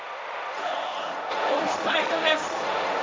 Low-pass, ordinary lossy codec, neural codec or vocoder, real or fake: none; none; codec, 16 kHz, 1.1 kbps, Voila-Tokenizer; fake